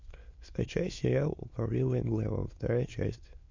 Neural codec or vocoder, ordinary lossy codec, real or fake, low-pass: autoencoder, 22.05 kHz, a latent of 192 numbers a frame, VITS, trained on many speakers; MP3, 48 kbps; fake; 7.2 kHz